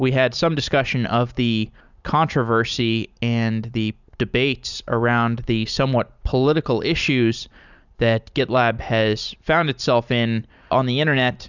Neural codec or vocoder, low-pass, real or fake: vocoder, 44.1 kHz, 128 mel bands every 256 samples, BigVGAN v2; 7.2 kHz; fake